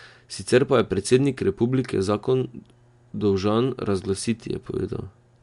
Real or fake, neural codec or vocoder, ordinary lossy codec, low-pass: real; none; MP3, 64 kbps; 10.8 kHz